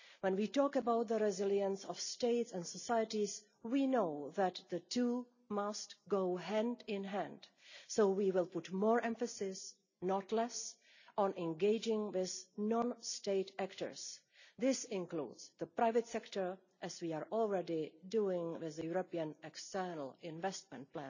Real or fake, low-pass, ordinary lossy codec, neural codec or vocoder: real; 7.2 kHz; none; none